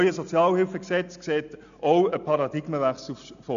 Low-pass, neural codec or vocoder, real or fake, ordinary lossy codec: 7.2 kHz; none; real; AAC, 96 kbps